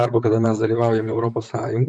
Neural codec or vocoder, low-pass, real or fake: vocoder, 44.1 kHz, 128 mel bands, Pupu-Vocoder; 10.8 kHz; fake